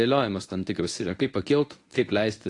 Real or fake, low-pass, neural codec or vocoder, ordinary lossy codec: fake; 10.8 kHz; codec, 24 kHz, 0.9 kbps, WavTokenizer, medium speech release version 1; AAC, 48 kbps